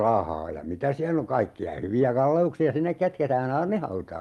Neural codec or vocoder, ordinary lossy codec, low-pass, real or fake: none; Opus, 32 kbps; 19.8 kHz; real